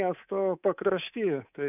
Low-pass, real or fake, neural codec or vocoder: 3.6 kHz; fake; codec, 24 kHz, 3.1 kbps, DualCodec